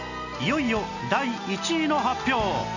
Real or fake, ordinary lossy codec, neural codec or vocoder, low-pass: real; none; none; 7.2 kHz